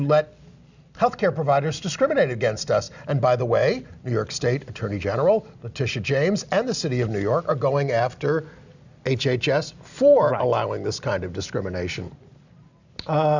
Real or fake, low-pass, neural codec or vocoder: real; 7.2 kHz; none